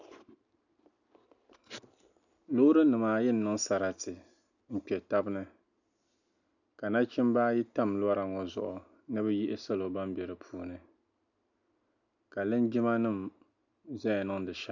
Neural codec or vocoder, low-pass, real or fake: none; 7.2 kHz; real